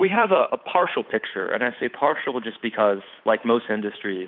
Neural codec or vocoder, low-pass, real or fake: codec, 16 kHz, 8 kbps, FunCodec, trained on Chinese and English, 25 frames a second; 5.4 kHz; fake